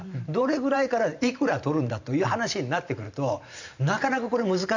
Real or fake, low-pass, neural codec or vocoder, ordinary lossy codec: fake; 7.2 kHz; vocoder, 44.1 kHz, 128 mel bands every 512 samples, BigVGAN v2; none